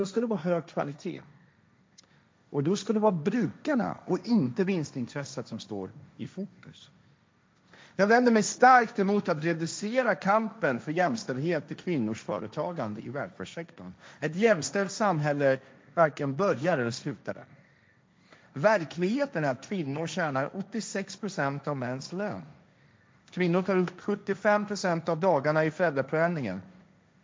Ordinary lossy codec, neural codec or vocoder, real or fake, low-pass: none; codec, 16 kHz, 1.1 kbps, Voila-Tokenizer; fake; none